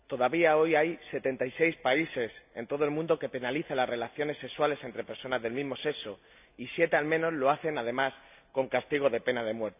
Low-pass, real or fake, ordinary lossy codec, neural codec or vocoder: 3.6 kHz; real; AAC, 32 kbps; none